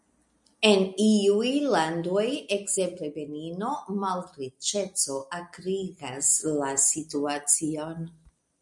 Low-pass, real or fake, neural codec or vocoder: 10.8 kHz; real; none